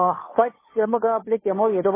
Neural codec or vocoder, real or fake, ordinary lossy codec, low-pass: codec, 16 kHz, 2 kbps, FunCodec, trained on Chinese and English, 25 frames a second; fake; MP3, 16 kbps; 3.6 kHz